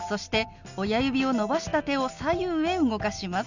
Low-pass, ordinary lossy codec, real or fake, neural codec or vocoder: 7.2 kHz; none; real; none